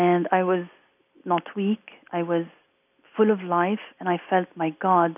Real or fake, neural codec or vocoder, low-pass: real; none; 3.6 kHz